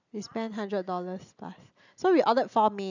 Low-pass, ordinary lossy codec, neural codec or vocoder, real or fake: 7.2 kHz; none; none; real